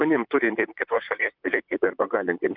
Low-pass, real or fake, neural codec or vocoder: 5.4 kHz; fake; vocoder, 44.1 kHz, 128 mel bands, Pupu-Vocoder